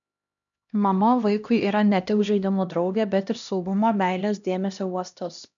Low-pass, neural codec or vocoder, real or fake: 7.2 kHz; codec, 16 kHz, 1 kbps, X-Codec, HuBERT features, trained on LibriSpeech; fake